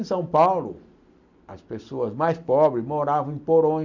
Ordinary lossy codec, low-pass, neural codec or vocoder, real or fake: none; 7.2 kHz; none; real